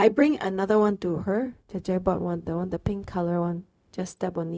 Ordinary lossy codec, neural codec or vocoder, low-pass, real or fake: none; codec, 16 kHz, 0.4 kbps, LongCat-Audio-Codec; none; fake